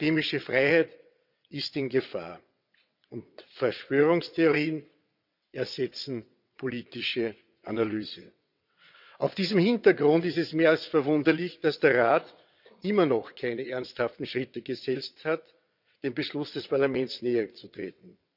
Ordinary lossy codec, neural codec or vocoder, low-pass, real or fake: none; vocoder, 22.05 kHz, 80 mel bands, WaveNeXt; 5.4 kHz; fake